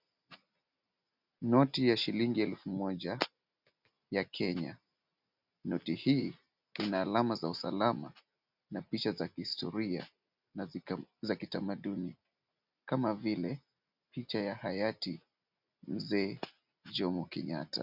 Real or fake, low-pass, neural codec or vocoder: real; 5.4 kHz; none